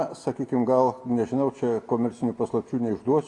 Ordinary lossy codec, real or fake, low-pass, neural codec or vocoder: AAC, 48 kbps; real; 10.8 kHz; none